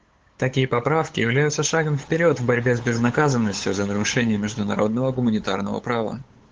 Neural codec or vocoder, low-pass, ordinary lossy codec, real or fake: codec, 16 kHz, 8 kbps, FunCodec, trained on LibriTTS, 25 frames a second; 7.2 kHz; Opus, 16 kbps; fake